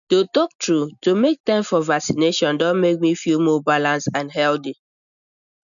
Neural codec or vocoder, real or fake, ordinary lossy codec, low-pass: none; real; none; 7.2 kHz